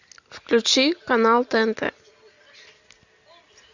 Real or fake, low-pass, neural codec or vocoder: real; 7.2 kHz; none